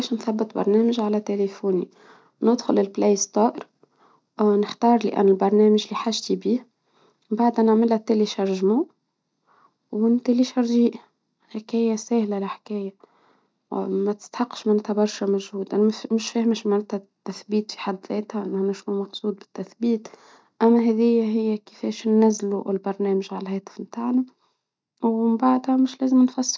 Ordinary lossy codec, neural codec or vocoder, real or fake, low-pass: none; none; real; none